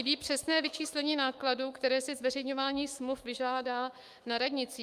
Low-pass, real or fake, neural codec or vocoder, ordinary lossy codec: 14.4 kHz; fake; autoencoder, 48 kHz, 128 numbers a frame, DAC-VAE, trained on Japanese speech; Opus, 32 kbps